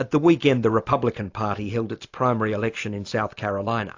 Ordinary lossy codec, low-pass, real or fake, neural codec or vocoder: AAC, 48 kbps; 7.2 kHz; real; none